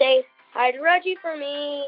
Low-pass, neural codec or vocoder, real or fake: 5.4 kHz; none; real